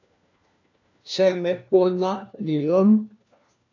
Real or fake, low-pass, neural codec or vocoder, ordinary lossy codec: fake; 7.2 kHz; codec, 16 kHz, 1 kbps, FunCodec, trained on LibriTTS, 50 frames a second; AAC, 48 kbps